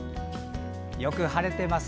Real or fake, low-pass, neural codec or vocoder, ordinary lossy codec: real; none; none; none